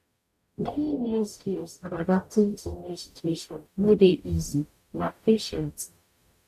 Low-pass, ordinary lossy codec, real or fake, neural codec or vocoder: 14.4 kHz; none; fake; codec, 44.1 kHz, 0.9 kbps, DAC